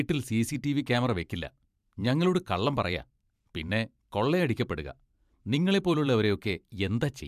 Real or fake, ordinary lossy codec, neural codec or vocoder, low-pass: fake; MP3, 96 kbps; vocoder, 44.1 kHz, 128 mel bands every 512 samples, BigVGAN v2; 14.4 kHz